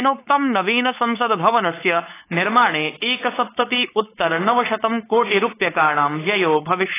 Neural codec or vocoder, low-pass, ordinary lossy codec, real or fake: codec, 16 kHz, 4.8 kbps, FACodec; 3.6 kHz; AAC, 16 kbps; fake